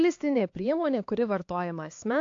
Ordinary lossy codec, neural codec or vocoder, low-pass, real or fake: AAC, 48 kbps; codec, 16 kHz, 2 kbps, X-Codec, HuBERT features, trained on LibriSpeech; 7.2 kHz; fake